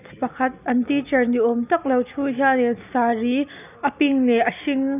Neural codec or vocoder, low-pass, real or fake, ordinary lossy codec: none; 3.6 kHz; real; none